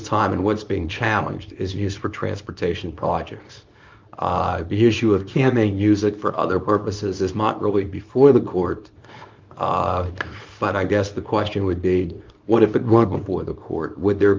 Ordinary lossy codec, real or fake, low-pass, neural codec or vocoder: Opus, 24 kbps; fake; 7.2 kHz; codec, 24 kHz, 0.9 kbps, WavTokenizer, small release